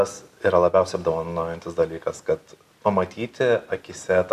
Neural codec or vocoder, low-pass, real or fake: none; 14.4 kHz; real